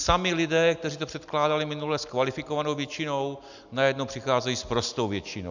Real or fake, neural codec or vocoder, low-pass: real; none; 7.2 kHz